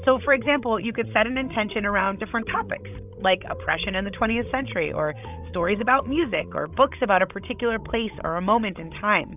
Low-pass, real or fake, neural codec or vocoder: 3.6 kHz; fake; codec, 16 kHz, 16 kbps, FreqCodec, larger model